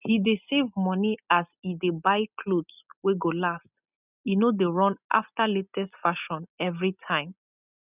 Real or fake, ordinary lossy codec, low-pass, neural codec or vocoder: real; none; 3.6 kHz; none